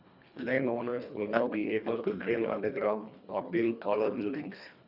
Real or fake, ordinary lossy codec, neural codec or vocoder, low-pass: fake; none; codec, 24 kHz, 1.5 kbps, HILCodec; 5.4 kHz